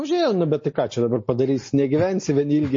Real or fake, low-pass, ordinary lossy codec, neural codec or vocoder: real; 7.2 kHz; MP3, 32 kbps; none